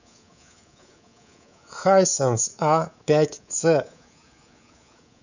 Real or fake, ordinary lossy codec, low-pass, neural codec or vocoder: fake; none; 7.2 kHz; codec, 24 kHz, 3.1 kbps, DualCodec